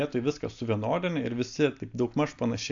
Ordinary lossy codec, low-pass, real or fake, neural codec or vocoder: AAC, 64 kbps; 7.2 kHz; real; none